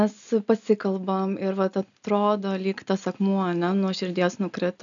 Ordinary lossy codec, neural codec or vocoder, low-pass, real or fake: MP3, 96 kbps; none; 7.2 kHz; real